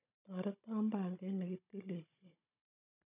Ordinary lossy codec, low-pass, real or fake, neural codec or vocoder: none; 3.6 kHz; fake; codec, 16 kHz, 16 kbps, FunCodec, trained on Chinese and English, 50 frames a second